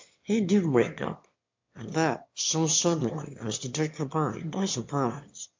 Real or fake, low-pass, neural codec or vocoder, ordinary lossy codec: fake; 7.2 kHz; autoencoder, 22.05 kHz, a latent of 192 numbers a frame, VITS, trained on one speaker; MP3, 48 kbps